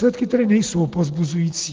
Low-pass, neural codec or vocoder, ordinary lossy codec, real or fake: 7.2 kHz; none; Opus, 16 kbps; real